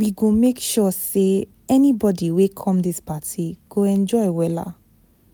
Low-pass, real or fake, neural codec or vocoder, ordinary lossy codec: none; real; none; none